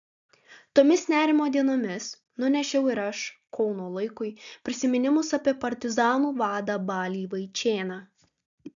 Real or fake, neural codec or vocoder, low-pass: real; none; 7.2 kHz